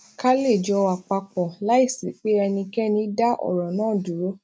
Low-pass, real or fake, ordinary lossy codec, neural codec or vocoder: none; real; none; none